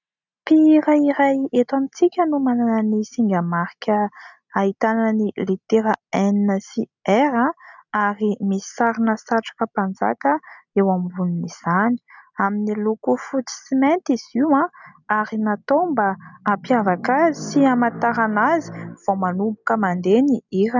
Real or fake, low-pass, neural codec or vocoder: real; 7.2 kHz; none